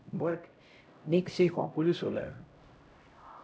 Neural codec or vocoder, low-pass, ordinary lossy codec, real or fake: codec, 16 kHz, 0.5 kbps, X-Codec, HuBERT features, trained on LibriSpeech; none; none; fake